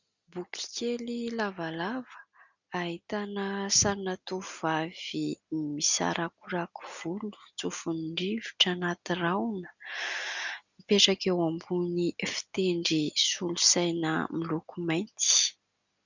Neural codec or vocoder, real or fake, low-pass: none; real; 7.2 kHz